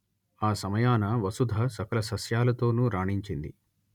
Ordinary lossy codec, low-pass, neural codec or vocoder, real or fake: none; 19.8 kHz; none; real